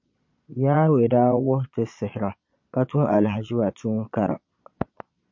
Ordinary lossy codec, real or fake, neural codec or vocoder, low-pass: MP3, 48 kbps; fake; vocoder, 22.05 kHz, 80 mel bands, Vocos; 7.2 kHz